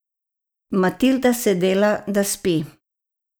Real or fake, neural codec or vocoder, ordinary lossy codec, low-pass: real; none; none; none